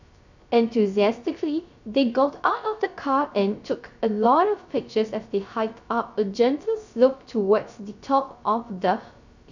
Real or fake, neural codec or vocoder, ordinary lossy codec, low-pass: fake; codec, 16 kHz, 0.3 kbps, FocalCodec; none; 7.2 kHz